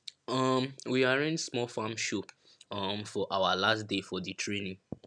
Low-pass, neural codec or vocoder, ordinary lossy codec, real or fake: 9.9 kHz; none; none; real